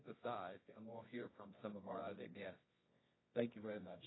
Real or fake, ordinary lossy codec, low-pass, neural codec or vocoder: fake; AAC, 16 kbps; 7.2 kHz; codec, 24 kHz, 0.9 kbps, WavTokenizer, medium music audio release